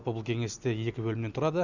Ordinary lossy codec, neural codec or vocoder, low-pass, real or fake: none; none; 7.2 kHz; real